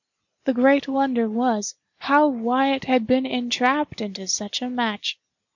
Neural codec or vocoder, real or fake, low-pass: none; real; 7.2 kHz